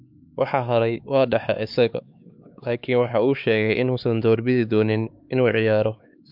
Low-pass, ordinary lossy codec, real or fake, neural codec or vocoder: 5.4 kHz; MP3, 48 kbps; fake; codec, 16 kHz, 4 kbps, X-Codec, HuBERT features, trained on LibriSpeech